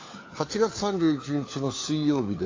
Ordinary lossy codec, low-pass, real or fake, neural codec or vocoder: AAC, 32 kbps; 7.2 kHz; fake; codec, 16 kHz, 4 kbps, FunCodec, trained on LibriTTS, 50 frames a second